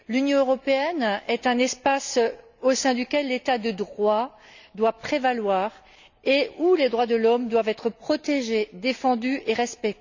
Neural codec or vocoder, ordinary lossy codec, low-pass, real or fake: none; none; 7.2 kHz; real